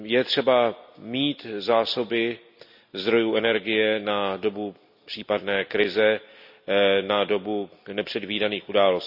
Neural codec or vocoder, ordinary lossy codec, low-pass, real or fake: none; none; 5.4 kHz; real